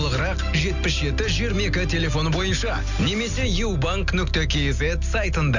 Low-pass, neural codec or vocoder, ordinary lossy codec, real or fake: 7.2 kHz; none; none; real